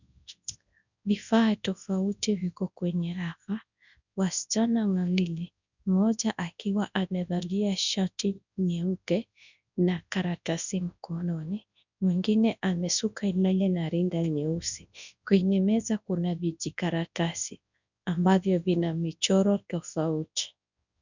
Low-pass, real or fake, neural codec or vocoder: 7.2 kHz; fake; codec, 24 kHz, 0.9 kbps, WavTokenizer, large speech release